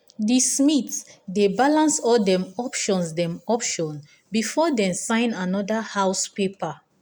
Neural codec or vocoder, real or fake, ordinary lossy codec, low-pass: none; real; none; none